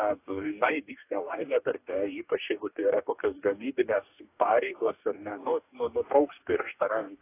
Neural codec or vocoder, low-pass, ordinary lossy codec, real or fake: codec, 44.1 kHz, 2.6 kbps, DAC; 3.6 kHz; AAC, 24 kbps; fake